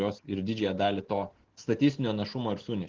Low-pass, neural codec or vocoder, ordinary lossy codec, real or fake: 7.2 kHz; none; Opus, 16 kbps; real